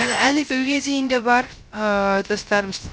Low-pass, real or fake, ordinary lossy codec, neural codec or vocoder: none; fake; none; codec, 16 kHz, 0.3 kbps, FocalCodec